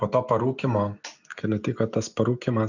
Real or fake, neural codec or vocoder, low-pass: real; none; 7.2 kHz